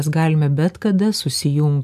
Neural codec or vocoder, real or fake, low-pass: none; real; 14.4 kHz